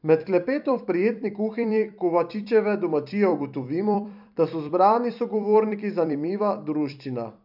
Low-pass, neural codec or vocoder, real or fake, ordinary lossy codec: 5.4 kHz; vocoder, 44.1 kHz, 128 mel bands every 256 samples, BigVGAN v2; fake; none